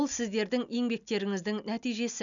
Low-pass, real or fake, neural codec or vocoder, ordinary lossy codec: 7.2 kHz; real; none; none